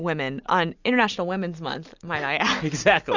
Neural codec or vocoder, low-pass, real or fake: none; 7.2 kHz; real